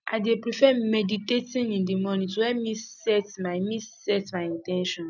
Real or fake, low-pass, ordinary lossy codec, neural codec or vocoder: real; 7.2 kHz; none; none